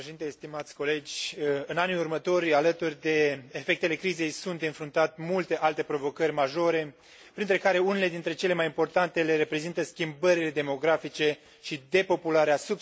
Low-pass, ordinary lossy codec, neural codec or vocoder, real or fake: none; none; none; real